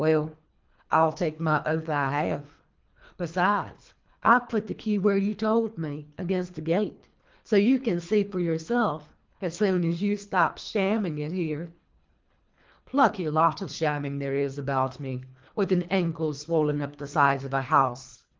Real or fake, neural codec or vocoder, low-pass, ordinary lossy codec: fake; codec, 24 kHz, 3 kbps, HILCodec; 7.2 kHz; Opus, 24 kbps